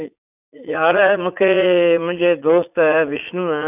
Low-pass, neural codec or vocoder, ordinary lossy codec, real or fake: 3.6 kHz; vocoder, 44.1 kHz, 80 mel bands, Vocos; none; fake